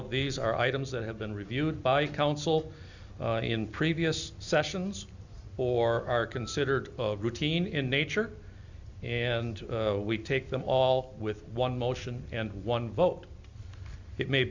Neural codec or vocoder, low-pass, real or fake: none; 7.2 kHz; real